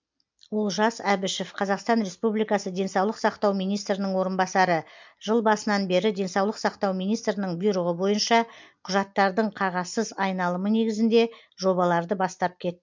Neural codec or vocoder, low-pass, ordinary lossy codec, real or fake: none; 7.2 kHz; MP3, 64 kbps; real